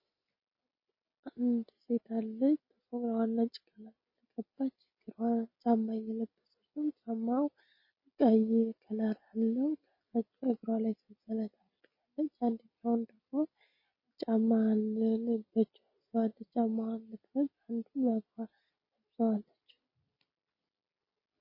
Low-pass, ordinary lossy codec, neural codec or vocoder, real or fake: 5.4 kHz; MP3, 24 kbps; none; real